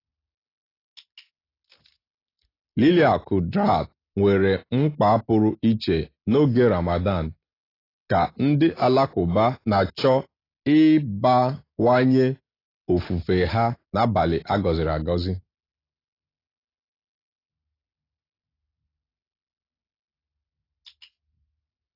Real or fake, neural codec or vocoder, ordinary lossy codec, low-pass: real; none; AAC, 24 kbps; 5.4 kHz